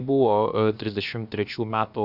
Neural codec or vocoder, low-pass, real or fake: codec, 16 kHz, about 1 kbps, DyCAST, with the encoder's durations; 5.4 kHz; fake